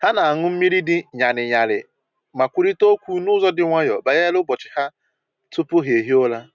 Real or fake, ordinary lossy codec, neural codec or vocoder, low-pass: real; none; none; 7.2 kHz